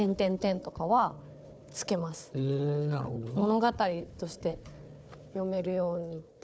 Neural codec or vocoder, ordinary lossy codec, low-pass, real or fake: codec, 16 kHz, 4 kbps, FunCodec, trained on Chinese and English, 50 frames a second; none; none; fake